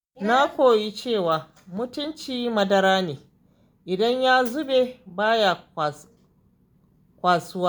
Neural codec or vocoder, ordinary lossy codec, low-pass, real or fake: none; none; none; real